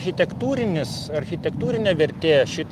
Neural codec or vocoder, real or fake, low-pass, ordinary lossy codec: none; real; 14.4 kHz; Opus, 24 kbps